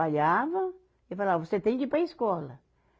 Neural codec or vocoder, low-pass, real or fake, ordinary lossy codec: none; none; real; none